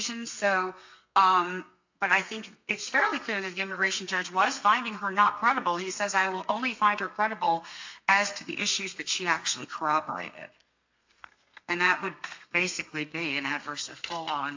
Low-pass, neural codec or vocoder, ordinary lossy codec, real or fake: 7.2 kHz; codec, 32 kHz, 1.9 kbps, SNAC; AAC, 48 kbps; fake